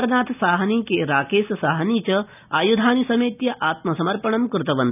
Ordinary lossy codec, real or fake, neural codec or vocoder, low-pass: none; real; none; 3.6 kHz